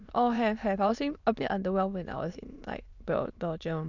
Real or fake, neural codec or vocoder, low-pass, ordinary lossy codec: fake; autoencoder, 22.05 kHz, a latent of 192 numbers a frame, VITS, trained on many speakers; 7.2 kHz; none